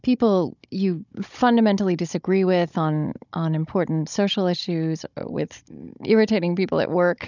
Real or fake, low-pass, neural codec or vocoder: fake; 7.2 kHz; codec, 16 kHz, 16 kbps, FunCodec, trained on Chinese and English, 50 frames a second